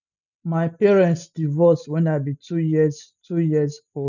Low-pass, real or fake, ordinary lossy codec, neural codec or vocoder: 7.2 kHz; real; none; none